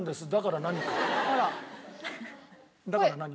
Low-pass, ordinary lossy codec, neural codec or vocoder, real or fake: none; none; none; real